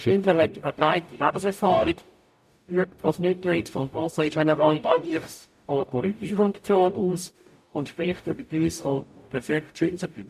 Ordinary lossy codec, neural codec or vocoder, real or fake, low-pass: MP3, 96 kbps; codec, 44.1 kHz, 0.9 kbps, DAC; fake; 14.4 kHz